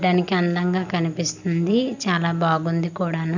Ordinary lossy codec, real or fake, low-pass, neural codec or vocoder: none; real; 7.2 kHz; none